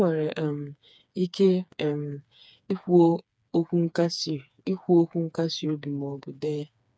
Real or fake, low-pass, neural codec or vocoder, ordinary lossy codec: fake; none; codec, 16 kHz, 4 kbps, FreqCodec, smaller model; none